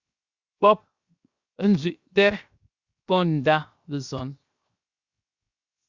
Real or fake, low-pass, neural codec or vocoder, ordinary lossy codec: fake; 7.2 kHz; codec, 16 kHz, 0.7 kbps, FocalCodec; Opus, 64 kbps